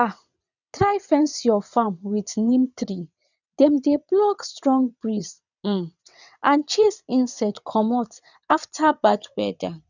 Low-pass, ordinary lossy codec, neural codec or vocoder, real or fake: 7.2 kHz; none; vocoder, 22.05 kHz, 80 mel bands, WaveNeXt; fake